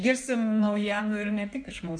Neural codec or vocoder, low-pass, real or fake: codec, 16 kHz in and 24 kHz out, 1.1 kbps, FireRedTTS-2 codec; 9.9 kHz; fake